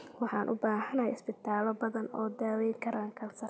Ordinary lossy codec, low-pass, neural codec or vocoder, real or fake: none; none; none; real